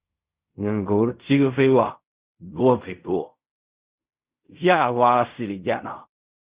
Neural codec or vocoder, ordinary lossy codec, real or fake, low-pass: codec, 16 kHz in and 24 kHz out, 0.4 kbps, LongCat-Audio-Codec, fine tuned four codebook decoder; Opus, 64 kbps; fake; 3.6 kHz